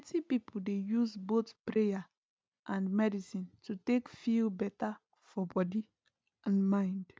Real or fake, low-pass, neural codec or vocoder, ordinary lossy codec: real; none; none; none